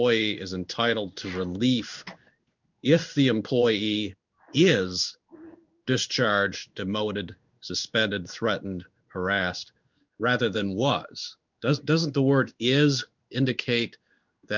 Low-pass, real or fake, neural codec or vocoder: 7.2 kHz; fake; codec, 16 kHz in and 24 kHz out, 1 kbps, XY-Tokenizer